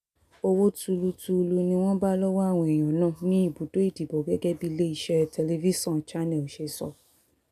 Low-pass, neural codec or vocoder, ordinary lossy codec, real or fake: 14.4 kHz; none; none; real